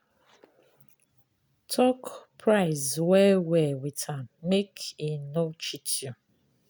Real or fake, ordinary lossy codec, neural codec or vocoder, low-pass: real; none; none; none